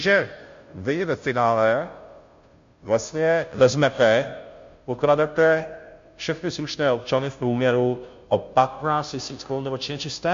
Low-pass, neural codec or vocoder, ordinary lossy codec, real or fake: 7.2 kHz; codec, 16 kHz, 0.5 kbps, FunCodec, trained on Chinese and English, 25 frames a second; MP3, 48 kbps; fake